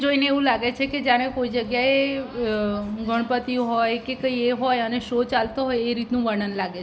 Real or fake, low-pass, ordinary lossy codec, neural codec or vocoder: real; none; none; none